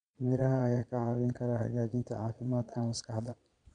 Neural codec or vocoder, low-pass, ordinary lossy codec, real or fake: vocoder, 22.05 kHz, 80 mel bands, Vocos; 9.9 kHz; none; fake